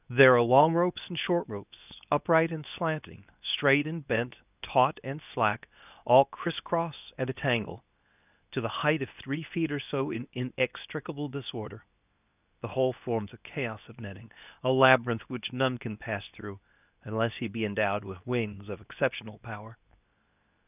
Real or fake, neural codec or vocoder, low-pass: fake; codec, 24 kHz, 0.9 kbps, WavTokenizer, medium speech release version 2; 3.6 kHz